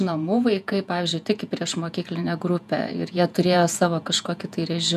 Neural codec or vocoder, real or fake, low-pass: vocoder, 48 kHz, 128 mel bands, Vocos; fake; 14.4 kHz